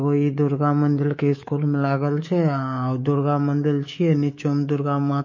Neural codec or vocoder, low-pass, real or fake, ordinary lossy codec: codec, 24 kHz, 3.1 kbps, DualCodec; 7.2 kHz; fake; MP3, 32 kbps